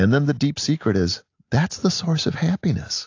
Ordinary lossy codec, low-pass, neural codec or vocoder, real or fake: AAC, 48 kbps; 7.2 kHz; none; real